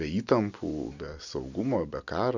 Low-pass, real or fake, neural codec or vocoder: 7.2 kHz; real; none